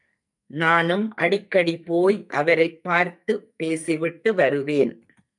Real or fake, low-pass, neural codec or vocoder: fake; 10.8 kHz; codec, 44.1 kHz, 2.6 kbps, SNAC